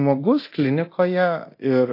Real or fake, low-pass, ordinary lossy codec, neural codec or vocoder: fake; 5.4 kHz; AAC, 32 kbps; codec, 24 kHz, 0.9 kbps, DualCodec